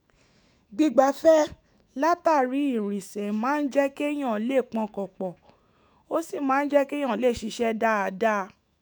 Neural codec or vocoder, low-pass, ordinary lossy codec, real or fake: autoencoder, 48 kHz, 128 numbers a frame, DAC-VAE, trained on Japanese speech; none; none; fake